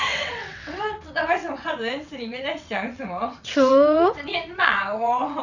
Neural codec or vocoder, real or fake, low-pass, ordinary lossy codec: vocoder, 22.05 kHz, 80 mel bands, WaveNeXt; fake; 7.2 kHz; none